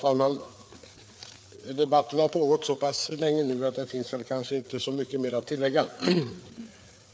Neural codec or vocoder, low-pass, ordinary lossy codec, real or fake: codec, 16 kHz, 4 kbps, FreqCodec, larger model; none; none; fake